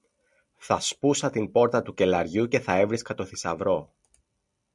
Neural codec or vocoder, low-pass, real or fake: none; 10.8 kHz; real